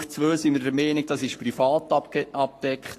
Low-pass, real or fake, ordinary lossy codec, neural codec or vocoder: 14.4 kHz; fake; AAC, 48 kbps; codec, 44.1 kHz, 7.8 kbps, Pupu-Codec